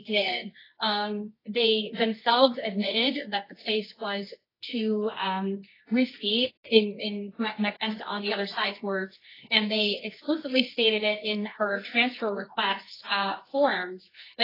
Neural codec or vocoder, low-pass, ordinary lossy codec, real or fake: codec, 24 kHz, 0.9 kbps, WavTokenizer, medium music audio release; 5.4 kHz; AAC, 24 kbps; fake